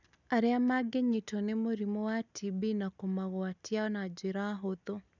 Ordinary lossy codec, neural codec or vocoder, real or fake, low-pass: none; none; real; 7.2 kHz